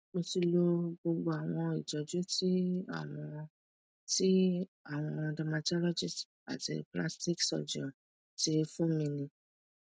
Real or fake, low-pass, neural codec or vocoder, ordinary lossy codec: real; none; none; none